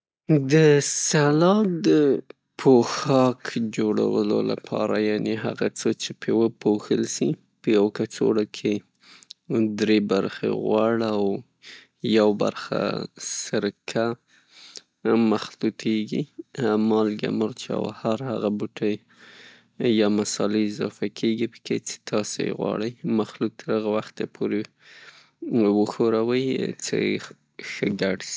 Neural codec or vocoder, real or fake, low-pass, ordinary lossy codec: none; real; none; none